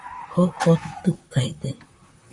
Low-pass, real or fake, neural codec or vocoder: 10.8 kHz; fake; vocoder, 44.1 kHz, 128 mel bands, Pupu-Vocoder